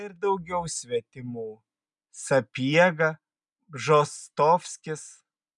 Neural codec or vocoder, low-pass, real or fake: none; 10.8 kHz; real